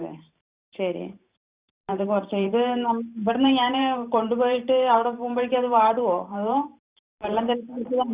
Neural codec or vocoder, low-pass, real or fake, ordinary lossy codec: vocoder, 44.1 kHz, 128 mel bands every 512 samples, BigVGAN v2; 3.6 kHz; fake; Opus, 64 kbps